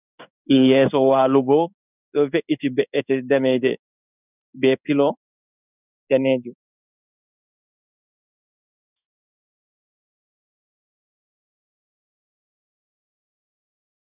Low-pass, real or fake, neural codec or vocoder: 3.6 kHz; fake; codec, 16 kHz in and 24 kHz out, 1 kbps, XY-Tokenizer